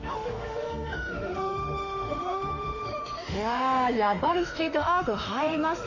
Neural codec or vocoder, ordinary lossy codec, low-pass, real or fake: autoencoder, 48 kHz, 32 numbers a frame, DAC-VAE, trained on Japanese speech; none; 7.2 kHz; fake